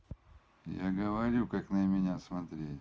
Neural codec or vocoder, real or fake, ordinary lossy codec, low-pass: none; real; none; none